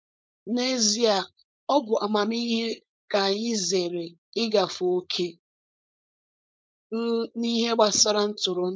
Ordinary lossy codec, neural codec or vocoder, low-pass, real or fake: none; codec, 16 kHz, 4.8 kbps, FACodec; none; fake